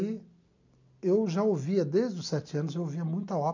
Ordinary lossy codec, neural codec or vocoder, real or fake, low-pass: none; none; real; 7.2 kHz